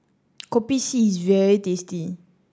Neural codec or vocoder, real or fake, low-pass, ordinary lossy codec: none; real; none; none